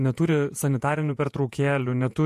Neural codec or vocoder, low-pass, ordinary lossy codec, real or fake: vocoder, 48 kHz, 128 mel bands, Vocos; 14.4 kHz; MP3, 64 kbps; fake